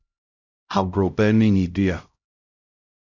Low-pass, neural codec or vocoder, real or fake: 7.2 kHz; codec, 16 kHz, 0.5 kbps, X-Codec, HuBERT features, trained on LibriSpeech; fake